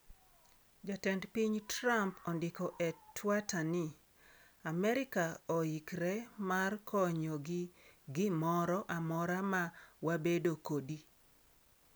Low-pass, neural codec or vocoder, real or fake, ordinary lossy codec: none; none; real; none